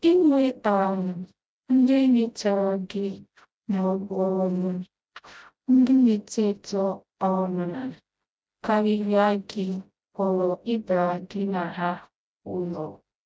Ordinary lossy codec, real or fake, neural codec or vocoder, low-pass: none; fake; codec, 16 kHz, 0.5 kbps, FreqCodec, smaller model; none